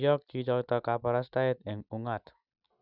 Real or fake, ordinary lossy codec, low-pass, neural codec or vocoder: real; none; 5.4 kHz; none